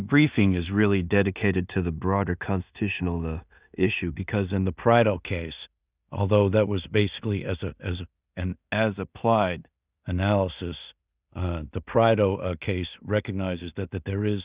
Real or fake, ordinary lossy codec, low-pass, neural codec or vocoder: fake; Opus, 64 kbps; 3.6 kHz; codec, 16 kHz in and 24 kHz out, 0.4 kbps, LongCat-Audio-Codec, two codebook decoder